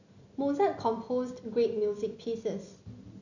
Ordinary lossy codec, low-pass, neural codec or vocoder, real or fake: none; 7.2 kHz; none; real